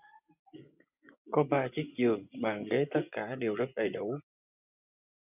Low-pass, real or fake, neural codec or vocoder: 3.6 kHz; fake; vocoder, 24 kHz, 100 mel bands, Vocos